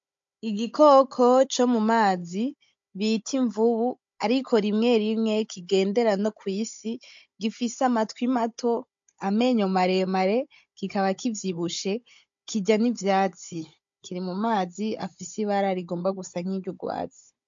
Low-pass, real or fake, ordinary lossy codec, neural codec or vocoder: 7.2 kHz; fake; MP3, 48 kbps; codec, 16 kHz, 16 kbps, FunCodec, trained on Chinese and English, 50 frames a second